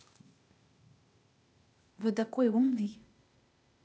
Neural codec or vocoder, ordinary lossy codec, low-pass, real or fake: codec, 16 kHz, 0.8 kbps, ZipCodec; none; none; fake